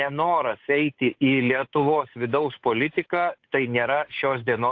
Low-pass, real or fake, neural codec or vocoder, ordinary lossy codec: 7.2 kHz; fake; codec, 16 kHz, 8 kbps, FunCodec, trained on Chinese and English, 25 frames a second; AAC, 48 kbps